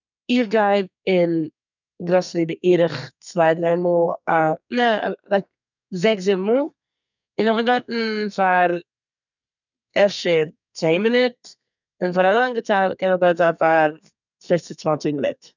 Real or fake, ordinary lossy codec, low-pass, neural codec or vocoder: fake; none; 7.2 kHz; codec, 32 kHz, 1.9 kbps, SNAC